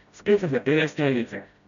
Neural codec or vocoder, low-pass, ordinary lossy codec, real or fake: codec, 16 kHz, 0.5 kbps, FreqCodec, smaller model; 7.2 kHz; none; fake